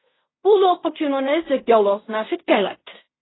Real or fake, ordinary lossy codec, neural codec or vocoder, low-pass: fake; AAC, 16 kbps; codec, 16 kHz in and 24 kHz out, 0.4 kbps, LongCat-Audio-Codec, fine tuned four codebook decoder; 7.2 kHz